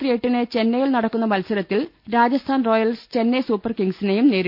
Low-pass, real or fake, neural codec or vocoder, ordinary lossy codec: 5.4 kHz; real; none; none